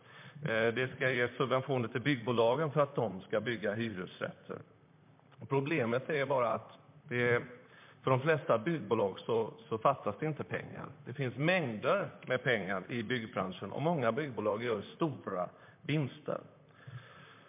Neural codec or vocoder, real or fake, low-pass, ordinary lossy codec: vocoder, 44.1 kHz, 128 mel bands, Pupu-Vocoder; fake; 3.6 kHz; MP3, 32 kbps